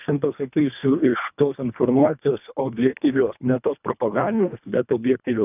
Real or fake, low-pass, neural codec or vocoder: fake; 3.6 kHz; codec, 24 kHz, 1.5 kbps, HILCodec